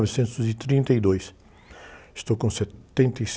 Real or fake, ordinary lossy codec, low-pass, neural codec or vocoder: real; none; none; none